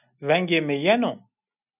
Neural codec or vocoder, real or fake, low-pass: none; real; 3.6 kHz